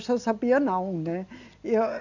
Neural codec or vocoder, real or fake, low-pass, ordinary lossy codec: none; real; 7.2 kHz; none